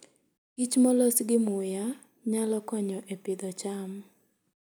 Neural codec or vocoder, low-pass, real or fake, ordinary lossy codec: none; none; real; none